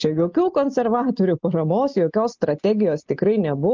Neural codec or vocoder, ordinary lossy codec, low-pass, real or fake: none; Opus, 24 kbps; 7.2 kHz; real